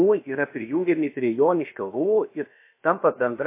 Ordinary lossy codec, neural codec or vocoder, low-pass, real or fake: MP3, 24 kbps; codec, 16 kHz, about 1 kbps, DyCAST, with the encoder's durations; 3.6 kHz; fake